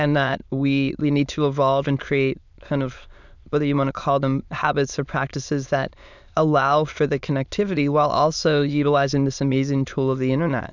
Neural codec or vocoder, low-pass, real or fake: autoencoder, 22.05 kHz, a latent of 192 numbers a frame, VITS, trained on many speakers; 7.2 kHz; fake